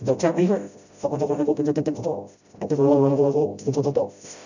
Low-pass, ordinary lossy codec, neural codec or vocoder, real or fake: 7.2 kHz; none; codec, 16 kHz, 0.5 kbps, FreqCodec, smaller model; fake